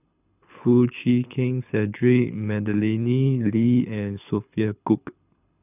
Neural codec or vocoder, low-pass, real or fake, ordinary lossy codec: codec, 24 kHz, 6 kbps, HILCodec; 3.6 kHz; fake; none